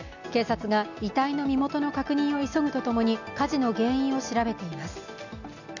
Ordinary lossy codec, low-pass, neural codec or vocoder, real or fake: none; 7.2 kHz; none; real